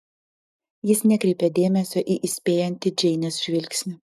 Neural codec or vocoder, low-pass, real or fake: none; 14.4 kHz; real